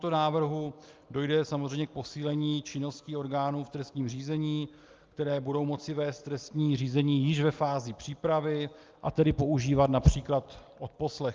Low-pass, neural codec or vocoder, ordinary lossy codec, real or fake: 7.2 kHz; none; Opus, 24 kbps; real